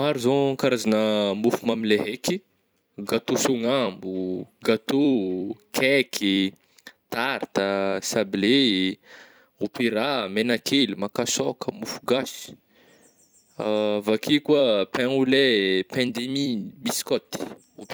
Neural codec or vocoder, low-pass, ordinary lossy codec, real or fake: vocoder, 44.1 kHz, 128 mel bands every 256 samples, BigVGAN v2; none; none; fake